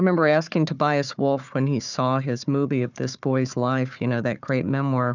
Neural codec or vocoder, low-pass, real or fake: codec, 16 kHz, 4 kbps, FunCodec, trained on Chinese and English, 50 frames a second; 7.2 kHz; fake